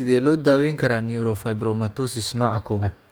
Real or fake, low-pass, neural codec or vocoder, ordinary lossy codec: fake; none; codec, 44.1 kHz, 2.6 kbps, DAC; none